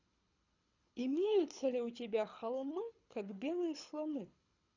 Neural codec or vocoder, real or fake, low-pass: codec, 24 kHz, 6 kbps, HILCodec; fake; 7.2 kHz